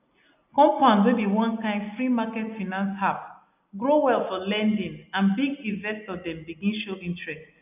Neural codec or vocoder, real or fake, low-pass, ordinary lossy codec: none; real; 3.6 kHz; none